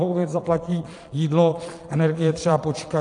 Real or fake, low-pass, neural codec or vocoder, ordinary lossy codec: fake; 9.9 kHz; vocoder, 22.05 kHz, 80 mel bands, Vocos; AAC, 48 kbps